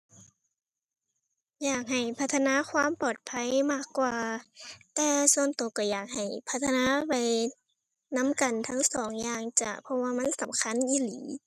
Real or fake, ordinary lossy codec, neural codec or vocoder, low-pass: real; none; none; 14.4 kHz